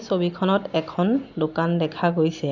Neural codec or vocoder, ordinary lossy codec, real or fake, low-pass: none; none; real; 7.2 kHz